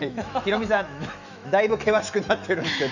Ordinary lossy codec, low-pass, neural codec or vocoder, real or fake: none; 7.2 kHz; autoencoder, 48 kHz, 128 numbers a frame, DAC-VAE, trained on Japanese speech; fake